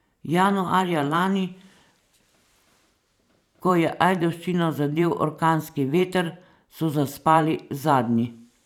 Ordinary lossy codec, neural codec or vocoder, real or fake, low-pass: none; vocoder, 44.1 kHz, 128 mel bands every 512 samples, BigVGAN v2; fake; 19.8 kHz